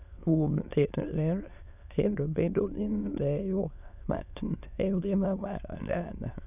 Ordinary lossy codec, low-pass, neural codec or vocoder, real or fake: none; 3.6 kHz; autoencoder, 22.05 kHz, a latent of 192 numbers a frame, VITS, trained on many speakers; fake